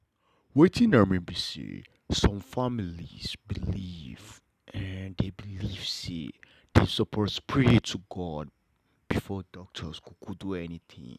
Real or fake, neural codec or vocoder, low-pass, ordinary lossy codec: real; none; 14.4 kHz; none